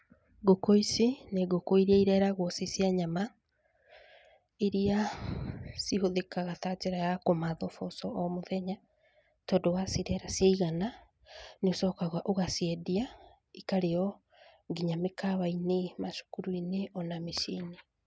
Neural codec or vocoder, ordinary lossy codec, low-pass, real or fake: none; none; none; real